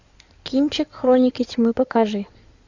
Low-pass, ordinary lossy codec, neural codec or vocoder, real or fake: 7.2 kHz; Opus, 64 kbps; codec, 16 kHz in and 24 kHz out, 2.2 kbps, FireRedTTS-2 codec; fake